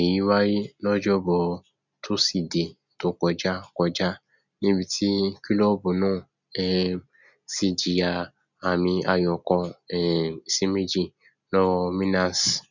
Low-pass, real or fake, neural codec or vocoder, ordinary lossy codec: 7.2 kHz; real; none; none